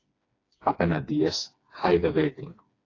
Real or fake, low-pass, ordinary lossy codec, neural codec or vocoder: fake; 7.2 kHz; AAC, 32 kbps; codec, 16 kHz, 2 kbps, FreqCodec, smaller model